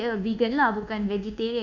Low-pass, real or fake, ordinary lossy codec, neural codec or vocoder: 7.2 kHz; fake; none; codec, 24 kHz, 1.2 kbps, DualCodec